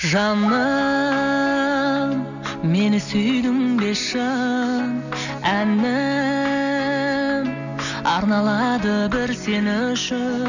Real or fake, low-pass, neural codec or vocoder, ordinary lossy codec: real; 7.2 kHz; none; none